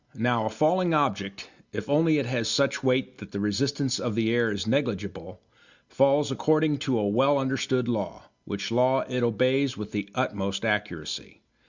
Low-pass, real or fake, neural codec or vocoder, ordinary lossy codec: 7.2 kHz; real; none; Opus, 64 kbps